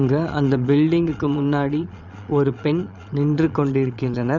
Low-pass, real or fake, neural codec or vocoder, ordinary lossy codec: 7.2 kHz; fake; vocoder, 22.05 kHz, 80 mel bands, Vocos; none